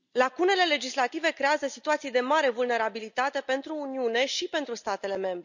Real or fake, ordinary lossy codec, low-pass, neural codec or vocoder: real; none; 7.2 kHz; none